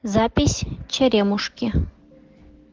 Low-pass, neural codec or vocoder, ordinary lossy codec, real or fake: 7.2 kHz; none; Opus, 16 kbps; real